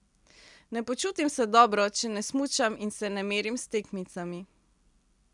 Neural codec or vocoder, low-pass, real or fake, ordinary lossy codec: none; 10.8 kHz; real; none